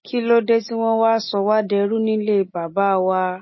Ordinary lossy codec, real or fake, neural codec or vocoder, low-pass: MP3, 24 kbps; real; none; 7.2 kHz